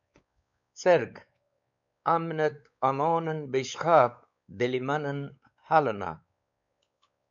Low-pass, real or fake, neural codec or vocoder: 7.2 kHz; fake; codec, 16 kHz, 4 kbps, X-Codec, WavLM features, trained on Multilingual LibriSpeech